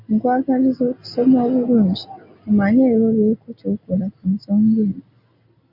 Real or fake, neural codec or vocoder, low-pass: real; none; 5.4 kHz